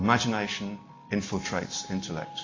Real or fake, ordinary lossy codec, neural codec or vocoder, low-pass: real; AAC, 32 kbps; none; 7.2 kHz